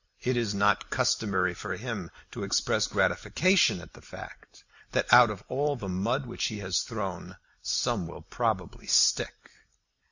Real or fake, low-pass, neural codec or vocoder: real; 7.2 kHz; none